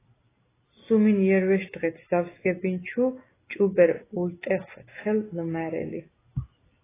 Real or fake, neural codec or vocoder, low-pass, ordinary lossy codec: real; none; 3.6 kHz; AAC, 16 kbps